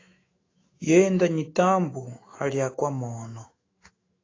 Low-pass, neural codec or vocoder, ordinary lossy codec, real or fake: 7.2 kHz; autoencoder, 48 kHz, 128 numbers a frame, DAC-VAE, trained on Japanese speech; AAC, 32 kbps; fake